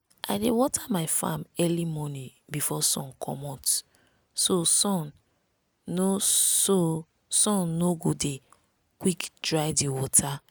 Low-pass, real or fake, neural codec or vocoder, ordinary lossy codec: none; real; none; none